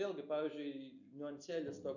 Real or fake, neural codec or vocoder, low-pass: real; none; 7.2 kHz